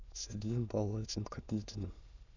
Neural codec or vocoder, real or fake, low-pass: autoencoder, 22.05 kHz, a latent of 192 numbers a frame, VITS, trained on many speakers; fake; 7.2 kHz